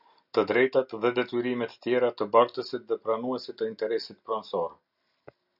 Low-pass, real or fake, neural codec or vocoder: 5.4 kHz; real; none